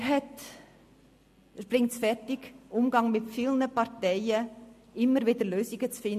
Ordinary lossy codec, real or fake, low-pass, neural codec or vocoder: MP3, 64 kbps; real; 14.4 kHz; none